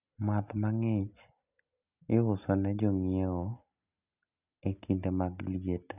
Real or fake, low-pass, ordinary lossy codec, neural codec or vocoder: real; 3.6 kHz; none; none